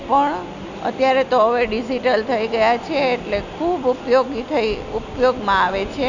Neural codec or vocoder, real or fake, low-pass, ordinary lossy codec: none; real; 7.2 kHz; none